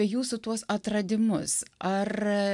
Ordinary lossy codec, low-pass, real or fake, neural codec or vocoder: MP3, 96 kbps; 10.8 kHz; real; none